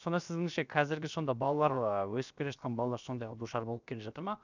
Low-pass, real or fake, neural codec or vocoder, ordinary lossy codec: 7.2 kHz; fake; codec, 16 kHz, about 1 kbps, DyCAST, with the encoder's durations; none